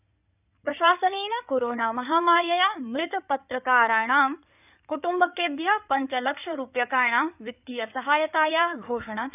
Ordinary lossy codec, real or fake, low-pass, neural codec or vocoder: none; fake; 3.6 kHz; codec, 16 kHz in and 24 kHz out, 2.2 kbps, FireRedTTS-2 codec